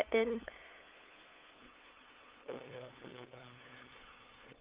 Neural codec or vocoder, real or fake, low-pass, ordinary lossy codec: codec, 16 kHz, 8 kbps, FunCodec, trained on LibriTTS, 25 frames a second; fake; 3.6 kHz; Opus, 24 kbps